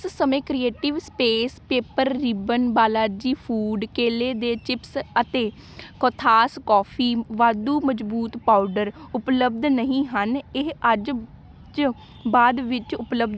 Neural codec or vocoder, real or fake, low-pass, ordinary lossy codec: none; real; none; none